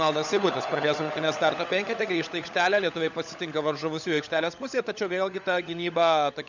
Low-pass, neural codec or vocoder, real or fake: 7.2 kHz; codec, 16 kHz, 16 kbps, FunCodec, trained on LibriTTS, 50 frames a second; fake